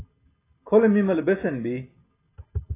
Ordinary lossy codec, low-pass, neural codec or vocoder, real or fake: AAC, 16 kbps; 3.6 kHz; none; real